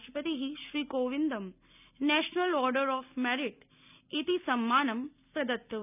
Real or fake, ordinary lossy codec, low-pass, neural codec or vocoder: real; none; 3.6 kHz; none